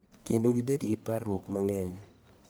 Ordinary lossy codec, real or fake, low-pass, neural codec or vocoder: none; fake; none; codec, 44.1 kHz, 1.7 kbps, Pupu-Codec